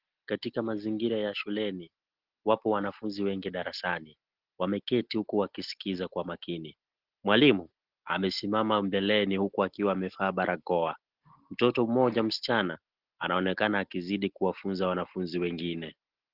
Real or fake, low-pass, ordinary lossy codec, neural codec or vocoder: real; 5.4 kHz; Opus, 16 kbps; none